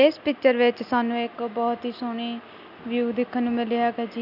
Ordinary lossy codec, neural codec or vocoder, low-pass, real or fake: none; none; 5.4 kHz; real